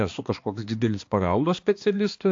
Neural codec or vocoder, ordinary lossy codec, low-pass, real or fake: codec, 16 kHz, 2 kbps, X-Codec, HuBERT features, trained on balanced general audio; AAC, 48 kbps; 7.2 kHz; fake